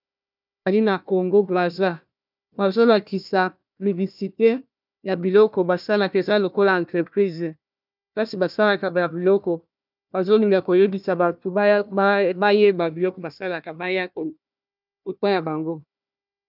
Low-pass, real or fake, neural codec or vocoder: 5.4 kHz; fake; codec, 16 kHz, 1 kbps, FunCodec, trained on Chinese and English, 50 frames a second